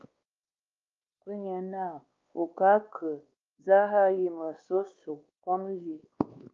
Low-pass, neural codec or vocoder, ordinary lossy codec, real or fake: 7.2 kHz; codec, 16 kHz, 2 kbps, X-Codec, WavLM features, trained on Multilingual LibriSpeech; Opus, 32 kbps; fake